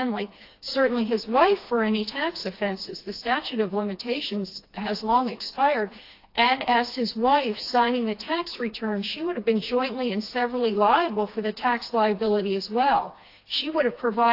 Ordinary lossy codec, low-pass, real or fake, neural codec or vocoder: AAC, 32 kbps; 5.4 kHz; fake; codec, 16 kHz, 2 kbps, FreqCodec, smaller model